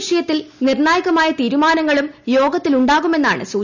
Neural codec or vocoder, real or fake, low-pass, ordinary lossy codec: none; real; 7.2 kHz; none